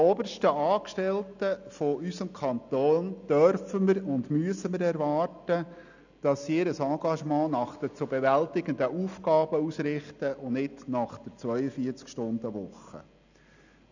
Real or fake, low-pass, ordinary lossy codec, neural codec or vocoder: real; 7.2 kHz; none; none